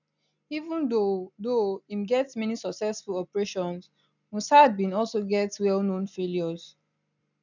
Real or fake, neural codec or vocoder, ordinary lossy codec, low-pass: real; none; none; 7.2 kHz